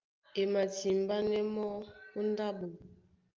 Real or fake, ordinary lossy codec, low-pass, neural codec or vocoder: real; Opus, 32 kbps; 7.2 kHz; none